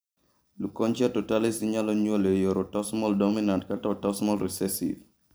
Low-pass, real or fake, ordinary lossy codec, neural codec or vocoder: none; fake; none; vocoder, 44.1 kHz, 128 mel bands every 512 samples, BigVGAN v2